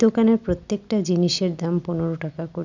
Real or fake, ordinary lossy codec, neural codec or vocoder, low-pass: real; none; none; 7.2 kHz